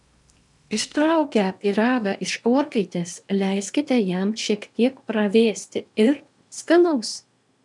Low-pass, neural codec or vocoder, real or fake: 10.8 kHz; codec, 16 kHz in and 24 kHz out, 0.8 kbps, FocalCodec, streaming, 65536 codes; fake